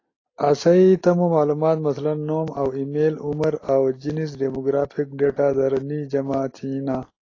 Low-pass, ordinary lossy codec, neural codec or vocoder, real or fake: 7.2 kHz; AAC, 32 kbps; none; real